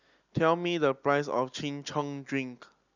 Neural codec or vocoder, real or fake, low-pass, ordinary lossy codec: none; real; 7.2 kHz; none